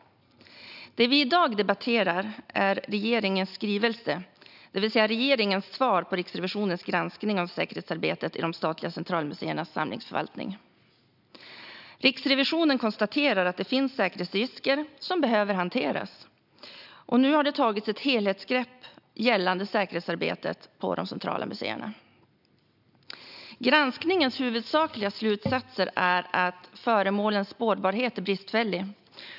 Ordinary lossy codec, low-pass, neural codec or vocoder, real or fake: none; 5.4 kHz; none; real